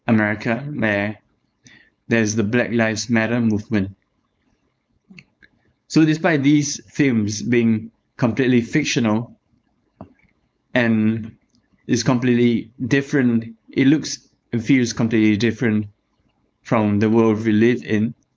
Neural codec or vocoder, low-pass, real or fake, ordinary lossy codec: codec, 16 kHz, 4.8 kbps, FACodec; none; fake; none